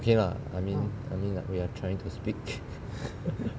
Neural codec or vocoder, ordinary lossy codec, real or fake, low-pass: none; none; real; none